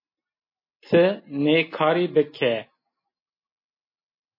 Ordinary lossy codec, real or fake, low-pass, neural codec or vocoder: MP3, 24 kbps; real; 5.4 kHz; none